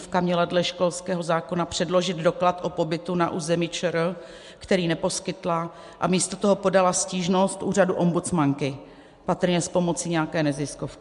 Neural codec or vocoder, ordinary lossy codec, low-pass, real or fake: none; MP3, 64 kbps; 10.8 kHz; real